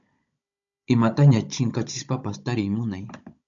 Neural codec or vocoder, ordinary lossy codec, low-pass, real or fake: codec, 16 kHz, 16 kbps, FunCodec, trained on Chinese and English, 50 frames a second; AAC, 64 kbps; 7.2 kHz; fake